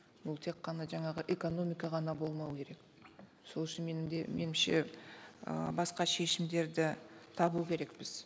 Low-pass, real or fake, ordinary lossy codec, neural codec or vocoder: none; real; none; none